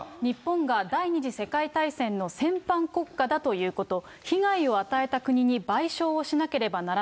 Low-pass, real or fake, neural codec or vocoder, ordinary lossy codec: none; real; none; none